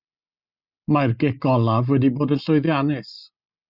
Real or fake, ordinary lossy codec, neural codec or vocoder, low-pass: real; Opus, 64 kbps; none; 5.4 kHz